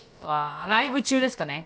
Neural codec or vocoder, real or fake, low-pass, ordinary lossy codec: codec, 16 kHz, about 1 kbps, DyCAST, with the encoder's durations; fake; none; none